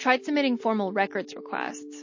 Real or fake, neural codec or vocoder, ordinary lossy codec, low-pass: real; none; MP3, 32 kbps; 7.2 kHz